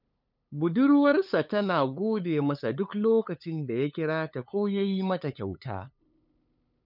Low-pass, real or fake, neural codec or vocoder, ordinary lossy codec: 5.4 kHz; fake; codec, 16 kHz, 8 kbps, FunCodec, trained on LibriTTS, 25 frames a second; none